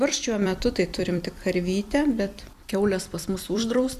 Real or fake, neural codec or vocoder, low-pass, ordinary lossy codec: fake; vocoder, 44.1 kHz, 128 mel bands every 256 samples, BigVGAN v2; 14.4 kHz; Opus, 64 kbps